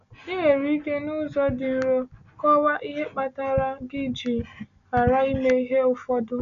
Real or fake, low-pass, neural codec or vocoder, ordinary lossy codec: real; 7.2 kHz; none; none